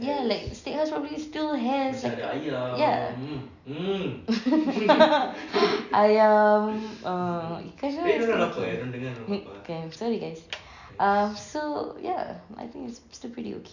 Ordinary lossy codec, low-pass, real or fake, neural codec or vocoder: none; 7.2 kHz; real; none